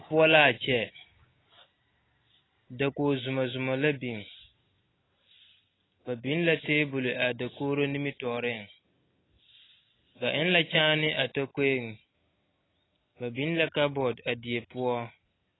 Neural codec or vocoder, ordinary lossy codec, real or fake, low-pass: none; AAC, 16 kbps; real; 7.2 kHz